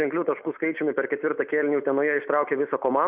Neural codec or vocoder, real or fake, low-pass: none; real; 3.6 kHz